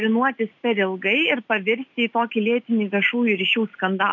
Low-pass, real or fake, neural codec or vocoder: 7.2 kHz; real; none